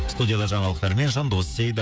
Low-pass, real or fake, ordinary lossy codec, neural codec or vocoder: none; fake; none; codec, 16 kHz, 16 kbps, FreqCodec, smaller model